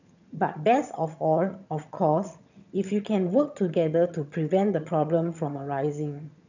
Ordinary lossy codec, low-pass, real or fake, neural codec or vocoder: none; 7.2 kHz; fake; vocoder, 22.05 kHz, 80 mel bands, HiFi-GAN